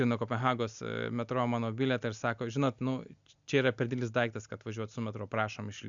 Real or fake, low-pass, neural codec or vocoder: real; 7.2 kHz; none